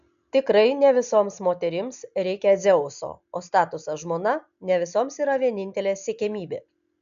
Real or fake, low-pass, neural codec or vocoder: real; 7.2 kHz; none